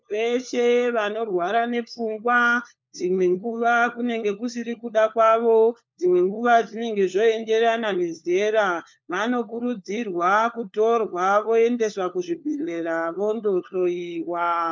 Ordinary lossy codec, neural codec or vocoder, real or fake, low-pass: MP3, 64 kbps; codec, 16 kHz, 4 kbps, FunCodec, trained on LibriTTS, 50 frames a second; fake; 7.2 kHz